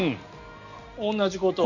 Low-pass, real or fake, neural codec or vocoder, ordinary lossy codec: 7.2 kHz; real; none; none